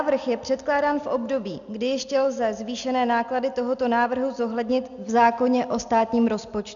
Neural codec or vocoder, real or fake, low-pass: none; real; 7.2 kHz